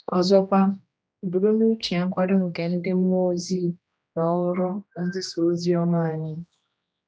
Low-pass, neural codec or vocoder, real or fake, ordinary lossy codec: none; codec, 16 kHz, 1 kbps, X-Codec, HuBERT features, trained on general audio; fake; none